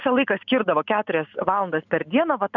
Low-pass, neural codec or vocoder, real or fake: 7.2 kHz; none; real